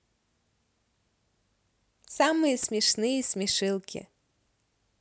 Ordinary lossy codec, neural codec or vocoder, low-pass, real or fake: none; none; none; real